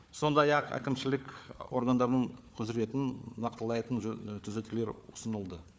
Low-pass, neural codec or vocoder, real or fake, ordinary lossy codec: none; codec, 16 kHz, 4 kbps, FunCodec, trained on Chinese and English, 50 frames a second; fake; none